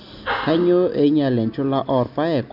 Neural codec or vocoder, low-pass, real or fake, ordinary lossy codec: none; 5.4 kHz; real; none